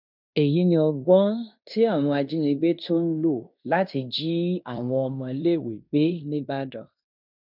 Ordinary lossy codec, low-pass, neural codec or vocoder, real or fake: none; 5.4 kHz; codec, 16 kHz in and 24 kHz out, 0.9 kbps, LongCat-Audio-Codec, fine tuned four codebook decoder; fake